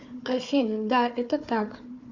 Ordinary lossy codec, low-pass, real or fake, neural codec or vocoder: MP3, 64 kbps; 7.2 kHz; fake; codec, 16 kHz, 4 kbps, FunCodec, trained on Chinese and English, 50 frames a second